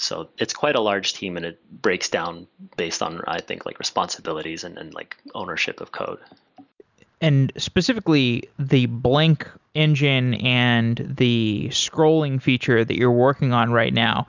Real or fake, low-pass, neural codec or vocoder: real; 7.2 kHz; none